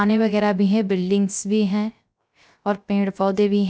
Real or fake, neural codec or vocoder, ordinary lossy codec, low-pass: fake; codec, 16 kHz, 0.3 kbps, FocalCodec; none; none